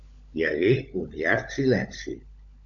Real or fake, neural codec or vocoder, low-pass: fake; codec, 16 kHz, 16 kbps, FunCodec, trained on LibriTTS, 50 frames a second; 7.2 kHz